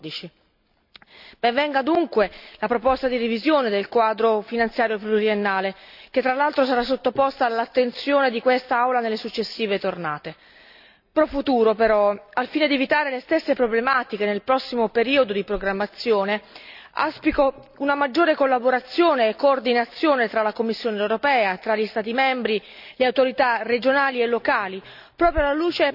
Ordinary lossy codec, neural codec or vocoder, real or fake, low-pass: none; none; real; 5.4 kHz